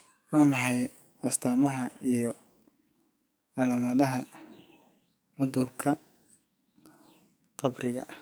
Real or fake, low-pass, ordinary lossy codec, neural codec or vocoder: fake; none; none; codec, 44.1 kHz, 2.6 kbps, SNAC